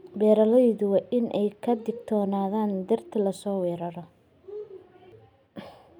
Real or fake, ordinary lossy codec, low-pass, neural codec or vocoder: real; none; 19.8 kHz; none